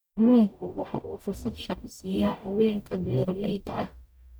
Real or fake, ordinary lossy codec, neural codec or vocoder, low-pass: fake; none; codec, 44.1 kHz, 0.9 kbps, DAC; none